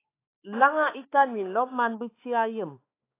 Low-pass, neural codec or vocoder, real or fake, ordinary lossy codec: 3.6 kHz; codec, 16 kHz, 4 kbps, X-Codec, HuBERT features, trained on balanced general audio; fake; AAC, 24 kbps